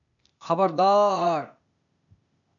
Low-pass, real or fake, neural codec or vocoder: 7.2 kHz; fake; codec, 16 kHz, 0.8 kbps, ZipCodec